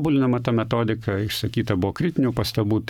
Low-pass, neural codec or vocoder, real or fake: 19.8 kHz; codec, 44.1 kHz, 7.8 kbps, Pupu-Codec; fake